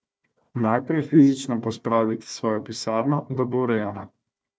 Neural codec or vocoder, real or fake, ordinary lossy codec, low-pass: codec, 16 kHz, 1 kbps, FunCodec, trained on Chinese and English, 50 frames a second; fake; none; none